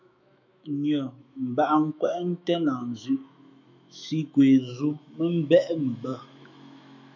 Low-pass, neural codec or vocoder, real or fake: 7.2 kHz; autoencoder, 48 kHz, 128 numbers a frame, DAC-VAE, trained on Japanese speech; fake